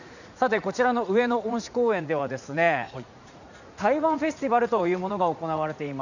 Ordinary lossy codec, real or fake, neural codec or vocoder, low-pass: none; fake; vocoder, 44.1 kHz, 80 mel bands, Vocos; 7.2 kHz